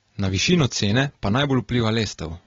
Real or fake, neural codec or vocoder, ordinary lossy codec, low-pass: real; none; AAC, 24 kbps; 7.2 kHz